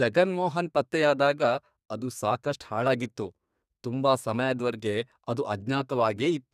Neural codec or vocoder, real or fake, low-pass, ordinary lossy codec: codec, 32 kHz, 1.9 kbps, SNAC; fake; 14.4 kHz; none